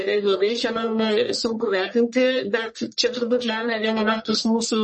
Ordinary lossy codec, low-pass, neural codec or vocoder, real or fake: MP3, 32 kbps; 10.8 kHz; codec, 44.1 kHz, 1.7 kbps, Pupu-Codec; fake